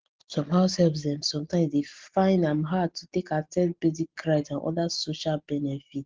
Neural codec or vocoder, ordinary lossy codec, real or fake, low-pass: none; Opus, 16 kbps; real; 7.2 kHz